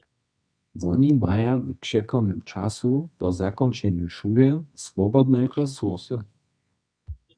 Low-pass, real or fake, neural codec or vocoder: 9.9 kHz; fake; codec, 24 kHz, 0.9 kbps, WavTokenizer, medium music audio release